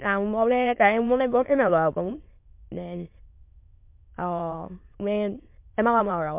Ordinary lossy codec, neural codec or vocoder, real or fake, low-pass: MP3, 32 kbps; autoencoder, 22.05 kHz, a latent of 192 numbers a frame, VITS, trained on many speakers; fake; 3.6 kHz